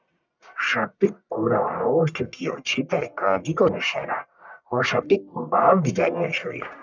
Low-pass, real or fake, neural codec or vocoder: 7.2 kHz; fake; codec, 44.1 kHz, 1.7 kbps, Pupu-Codec